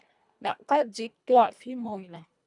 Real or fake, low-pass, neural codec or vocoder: fake; 10.8 kHz; codec, 24 kHz, 1.5 kbps, HILCodec